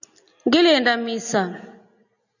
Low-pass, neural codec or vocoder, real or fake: 7.2 kHz; none; real